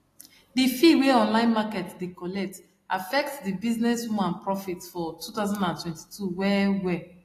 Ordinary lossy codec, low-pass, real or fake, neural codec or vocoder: AAC, 48 kbps; 14.4 kHz; real; none